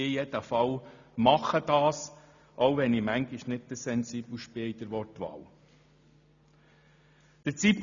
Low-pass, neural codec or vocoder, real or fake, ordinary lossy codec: 7.2 kHz; none; real; none